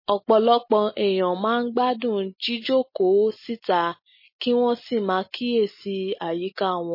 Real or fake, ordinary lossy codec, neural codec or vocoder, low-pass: real; MP3, 24 kbps; none; 5.4 kHz